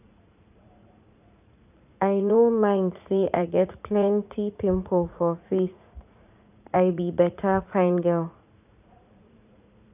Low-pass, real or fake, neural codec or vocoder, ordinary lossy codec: 3.6 kHz; fake; vocoder, 44.1 kHz, 80 mel bands, Vocos; none